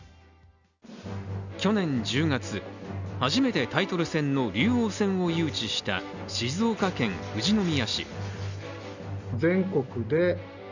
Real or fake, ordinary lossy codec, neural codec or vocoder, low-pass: real; none; none; 7.2 kHz